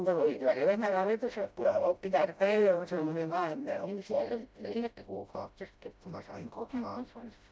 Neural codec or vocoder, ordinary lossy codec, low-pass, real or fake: codec, 16 kHz, 0.5 kbps, FreqCodec, smaller model; none; none; fake